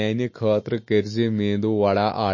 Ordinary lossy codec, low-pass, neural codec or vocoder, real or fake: MP3, 32 kbps; 7.2 kHz; none; real